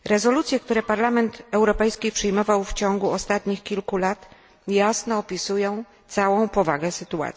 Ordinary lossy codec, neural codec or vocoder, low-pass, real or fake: none; none; none; real